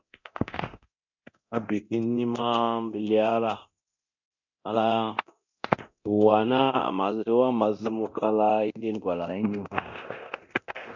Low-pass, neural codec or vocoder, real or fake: 7.2 kHz; codec, 24 kHz, 0.9 kbps, DualCodec; fake